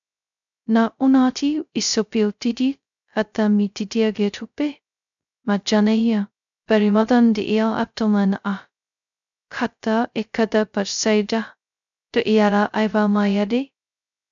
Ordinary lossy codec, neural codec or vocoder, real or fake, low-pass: MP3, 96 kbps; codec, 16 kHz, 0.2 kbps, FocalCodec; fake; 7.2 kHz